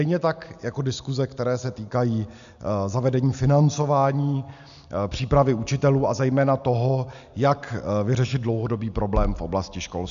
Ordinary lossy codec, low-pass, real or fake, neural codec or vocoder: MP3, 96 kbps; 7.2 kHz; real; none